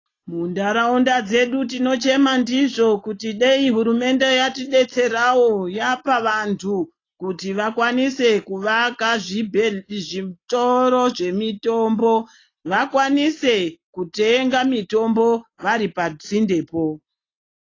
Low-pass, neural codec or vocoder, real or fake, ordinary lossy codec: 7.2 kHz; none; real; AAC, 32 kbps